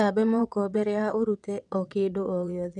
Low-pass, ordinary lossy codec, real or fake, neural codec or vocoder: 9.9 kHz; none; fake; vocoder, 22.05 kHz, 80 mel bands, WaveNeXt